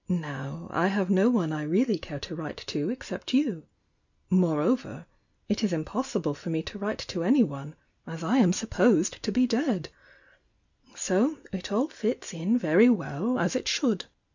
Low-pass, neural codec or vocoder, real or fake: 7.2 kHz; none; real